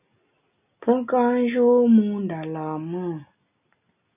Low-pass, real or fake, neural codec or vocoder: 3.6 kHz; real; none